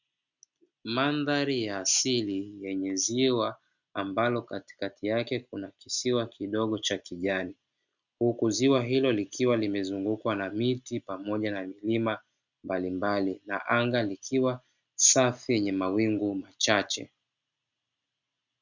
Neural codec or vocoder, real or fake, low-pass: none; real; 7.2 kHz